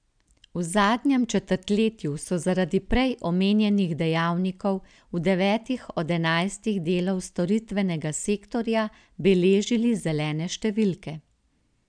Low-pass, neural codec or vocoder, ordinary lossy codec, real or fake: 9.9 kHz; none; none; real